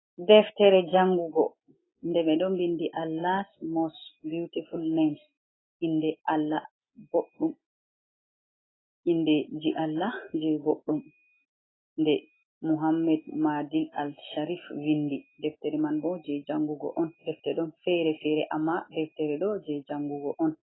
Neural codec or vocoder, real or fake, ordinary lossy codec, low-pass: none; real; AAC, 16 kbps; 7.2 kHz